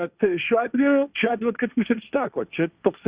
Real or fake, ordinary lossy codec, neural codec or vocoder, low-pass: fake; Opus, 64 kbps; codec, 16 kHz, 1.1 kbps, Voila-Tokenizer; 3.6 kHz